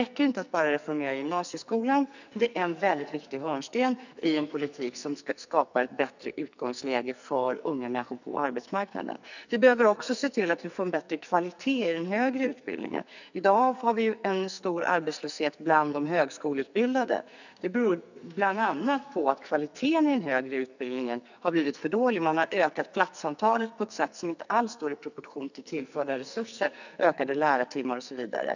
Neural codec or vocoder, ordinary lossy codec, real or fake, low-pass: codec, 44.1 kHz, 2.6 kbps, SNAC; none; fake; 7.2 kHz